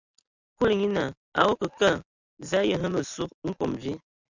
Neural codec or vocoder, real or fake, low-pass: none; real; 7.2 kHz